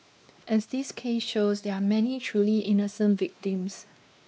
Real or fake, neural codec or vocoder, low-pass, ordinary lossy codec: fake; codec, 16 kHz, 2 kbps, X-Codec, WavLM features, trained on Multilingual LibriSpeech; none; none